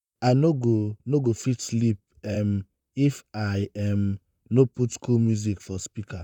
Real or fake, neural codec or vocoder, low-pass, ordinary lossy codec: fake; vocoder, 44.1 kHz, 128 mel bands, Pupu-Vocoder; 19.8 kHz; none